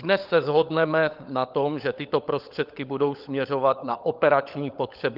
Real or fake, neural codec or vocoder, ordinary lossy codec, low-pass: fake; codec, 16 kHz, 16 kbps, FunCodec, trained on LibriTTS, 50 frames a second; Opus, 32 kbps; 5.4 kHz